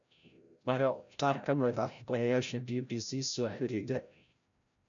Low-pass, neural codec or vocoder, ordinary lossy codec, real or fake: 7.2 kHz; codec, 16 kHz, 0.5 kbps, FreqCodec, larger model; none; fake